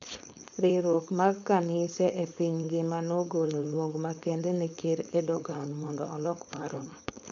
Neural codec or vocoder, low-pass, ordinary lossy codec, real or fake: codec, 16 kHz, 4.8 kbps, FACodec; 7.2 kHz; none; fake